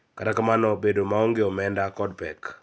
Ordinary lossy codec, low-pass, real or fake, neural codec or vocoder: none; none; real; none